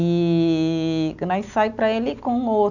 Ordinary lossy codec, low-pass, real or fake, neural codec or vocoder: none; 7.2 kHz; real; none